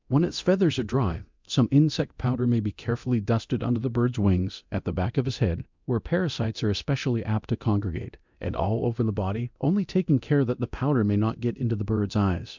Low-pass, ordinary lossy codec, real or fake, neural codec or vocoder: 7.2 kHz; MP3, 64 kbps; fake; codec, 24 kHz, 0.9 kbps, DualCodec